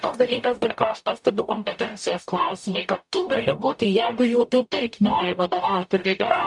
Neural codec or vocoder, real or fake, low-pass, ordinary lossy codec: codec, 44.1 kHz, 0.9 kbps, DAC; fake; 10.8 kHz; MP3, 96 kbps